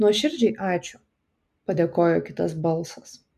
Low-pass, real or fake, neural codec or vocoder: 14.4 kHz; real; none